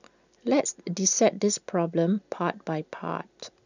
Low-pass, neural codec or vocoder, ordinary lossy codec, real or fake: 7.2 kHz; none; none; real